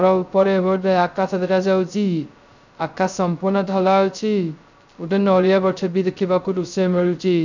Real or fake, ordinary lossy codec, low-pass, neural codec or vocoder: fake; none; 7.2 kHz; codec, 16 kHz, 0.2 kbps, FocalCodec